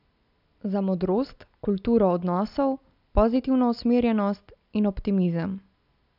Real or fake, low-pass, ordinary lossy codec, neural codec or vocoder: real; 5.4 kHz; none; none